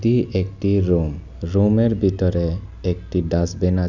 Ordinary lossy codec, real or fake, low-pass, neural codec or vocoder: none; real; 7.2 kHz; none